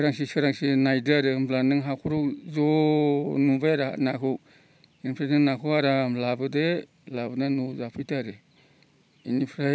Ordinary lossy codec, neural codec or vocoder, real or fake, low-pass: none; none; real; none